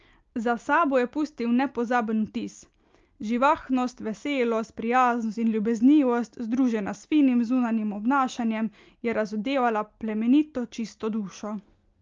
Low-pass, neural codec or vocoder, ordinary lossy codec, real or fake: 7.2 kHz; none; Opus, 24 kbps; real